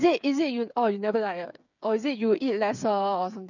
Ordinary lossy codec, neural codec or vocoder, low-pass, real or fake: none; codec, 16 kHz, 8 kbps, FreqCodec, smaller model; 7.2 kHz; fake